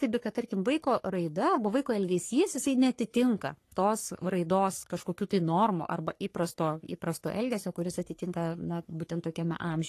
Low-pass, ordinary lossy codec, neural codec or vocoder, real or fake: 14.4 kHz; AAC, 48 kbps; codec, 44.1 kHz, 3.4 kbps, Pupu-Codec; fake